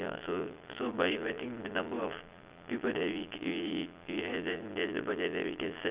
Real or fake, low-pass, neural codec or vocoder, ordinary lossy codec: fake; 3.6 kHz; vocoder, 22.05 kHz, 80 mel bands, Vocos; Opus, 32 kbps